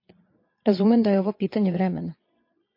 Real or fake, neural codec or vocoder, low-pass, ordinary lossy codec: fake; vocoder, 22.05 kHz, 80 mel bands, Vocos; 5.4 kHz; MP3, 32 kbps